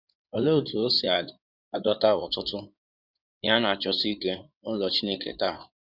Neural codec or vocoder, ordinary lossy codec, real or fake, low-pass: codec, 16 kHz in and 24 kHz out, 2.2 kbps, FireRedTTS-2 codec; none; fake; 5.4 kHz